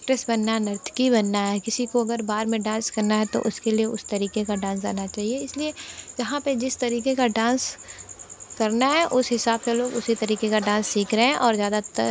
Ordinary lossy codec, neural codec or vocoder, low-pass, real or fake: none; none; none; real